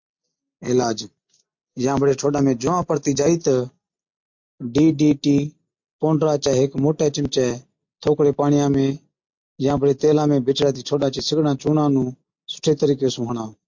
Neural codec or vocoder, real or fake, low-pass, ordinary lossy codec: none; real; 7.2 kHz; MP3, 48 kbps